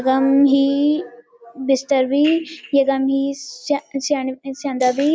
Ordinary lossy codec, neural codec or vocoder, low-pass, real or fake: none; none; none; real